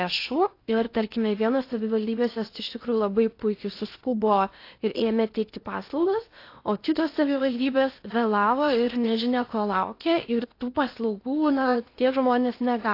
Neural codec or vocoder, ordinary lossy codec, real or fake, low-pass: codec, 16 kHz in and 24 kHz out, 0.8 kbps, FocalCodec, streaming, 65536 codes; AAC, 32 kbps; fake; 5.4 kHz